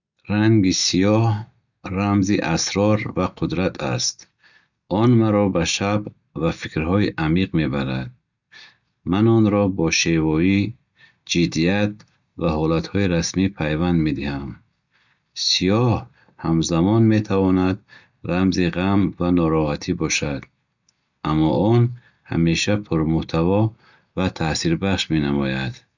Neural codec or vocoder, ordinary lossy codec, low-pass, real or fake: none; none; 7.2 kHz; real